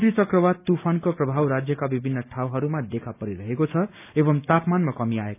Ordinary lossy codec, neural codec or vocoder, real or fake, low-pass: none; none; real; 3.6 kHz